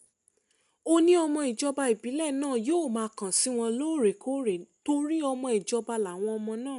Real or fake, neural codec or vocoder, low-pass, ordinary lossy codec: real; none; 10.8 kHz; none